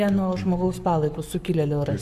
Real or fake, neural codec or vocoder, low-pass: fake; codec, 44.1 kHz, 7.8 kbps, Pupu-Codec; 14.4 kHz